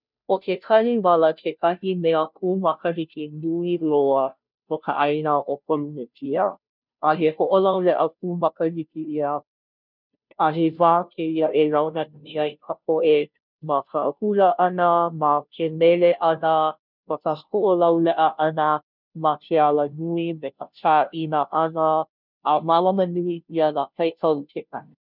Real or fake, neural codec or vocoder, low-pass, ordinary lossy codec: fake; codec, 16 kHz, 0.5 kbps, FunCodec, trained on Chinese and English, 25 frames a second; 5.4 kHz; none